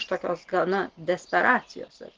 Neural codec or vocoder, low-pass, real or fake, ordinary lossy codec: none; 7.2 kHz; real; Opus, 24 kbps